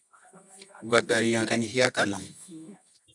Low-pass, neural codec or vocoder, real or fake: 10.8 kHz; codec, 24 kHz, 0.9 kbps, WavTokenizer, medium music audio release; fake